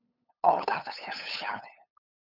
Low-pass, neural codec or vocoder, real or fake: 5.4 kHz; codec, 16 kHz, 8 kbps, FunCodec, trained on LibriTTS, 25 frames a second; fake